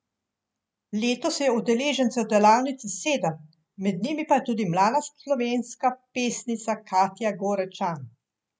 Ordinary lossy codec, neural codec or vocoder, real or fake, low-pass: none; none; real; none